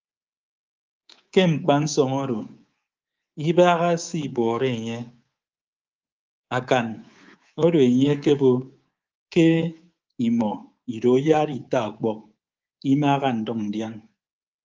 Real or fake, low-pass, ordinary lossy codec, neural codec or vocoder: fake; 7.2 kHz; Opus, 32 kbps; codec, 24 kHz, 3.1 kbps, DualCodec